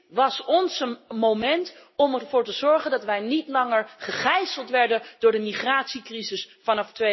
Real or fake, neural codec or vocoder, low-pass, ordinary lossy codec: real; none; 7.2 kHz; MP3, 24 kbps